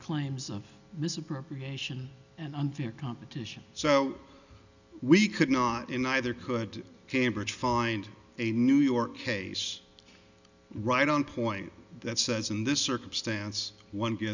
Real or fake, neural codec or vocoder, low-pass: real; none; 7.2 kHz